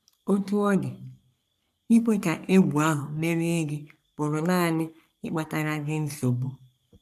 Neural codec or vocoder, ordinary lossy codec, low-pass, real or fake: codec, 44.1 kHz, 3.4 kbps, Pupu-Codec; none; 14.4 kHz; fake